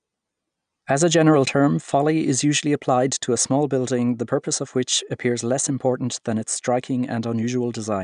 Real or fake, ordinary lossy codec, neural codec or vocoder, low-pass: real; none; none; 9.9 kHz